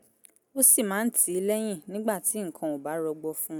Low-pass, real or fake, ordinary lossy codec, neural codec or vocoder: none; real; none; none